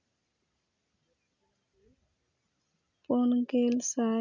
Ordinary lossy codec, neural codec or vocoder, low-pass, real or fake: none; none; 7.2 kHz; real